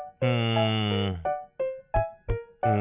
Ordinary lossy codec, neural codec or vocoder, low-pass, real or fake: none; none; 3.6 kHz; real